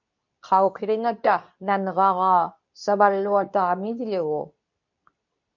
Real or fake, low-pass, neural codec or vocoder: fake; 7.2 kHz; codec, 24 kHz, 0.9 kbps, WavTokenizer, medium speech release version 2